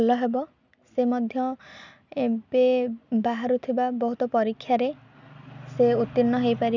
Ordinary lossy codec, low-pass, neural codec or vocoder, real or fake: none; 7.2 kHz; none; real